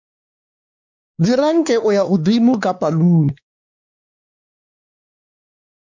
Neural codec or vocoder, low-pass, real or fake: codec, 16 kHz, 2 kbps, X-Codec, WavLM features, trained on Multilingual LibriSpeech; 7.2 kHz; fake